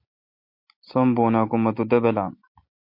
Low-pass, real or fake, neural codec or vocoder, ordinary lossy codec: 5.4 kHz; real; none; MP3, 32 kbps